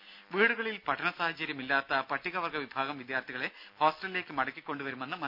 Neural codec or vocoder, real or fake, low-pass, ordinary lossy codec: none; real; 5.4 kHz; none